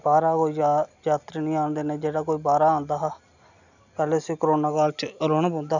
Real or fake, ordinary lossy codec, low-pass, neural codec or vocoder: real; none; 7.2 kHz; none